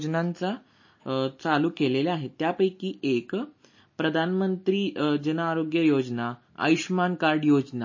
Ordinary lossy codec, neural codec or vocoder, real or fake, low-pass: MP3, 32 kbps; none; real; 7.2 kHz